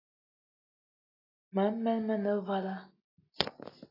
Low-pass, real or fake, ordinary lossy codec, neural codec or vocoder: 5.4 kHz; real; AAC, 24 kbps; none